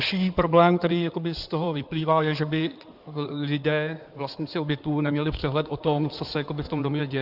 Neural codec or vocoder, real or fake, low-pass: codec, 16 kHz in and 24 kHz out, 2.2 kbps, FireRedTTS-2 codec; fake; 5.4 kHz